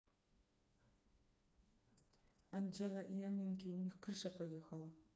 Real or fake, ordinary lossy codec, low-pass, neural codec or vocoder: fake; none; none; codec, 16 kHz, 2 kbps, FreqCodec, smaller model